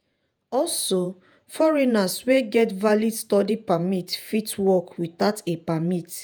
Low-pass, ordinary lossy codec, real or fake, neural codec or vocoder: none; none; fake; vocoder, 48 kHz, 128 mel bands, Vocos